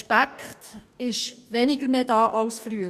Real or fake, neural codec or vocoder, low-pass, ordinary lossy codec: fake; codec, 44.1 kHz, 2.6 kbps, DAC; 14.4 kHz; none